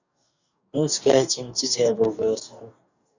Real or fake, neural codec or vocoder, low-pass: fake; codec, 44.1 kHz, 2.6 kbps, DAC; 7.2 kHz